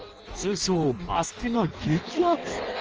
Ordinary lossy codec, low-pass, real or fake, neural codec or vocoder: Opus, 24 kbps; 7.2 kHz; fake; codec, 16 kHz in and 24 kHz out, 0.6 kbps, FireRedTTS-2 codec